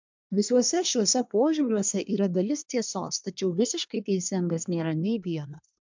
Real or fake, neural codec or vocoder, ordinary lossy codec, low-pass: fake; codec, 24 kHz, 1 kbps, SNAC; MP3, 64 kbps; 7.2 kHz